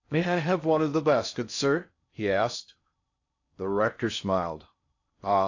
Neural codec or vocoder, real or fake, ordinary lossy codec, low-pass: codec, 16 kHz in and 24 kHz out, 0.6 kbps, FocalCodec, streaming, 4096 codes; fake; AAC, 48 kbps; 7.2 kHz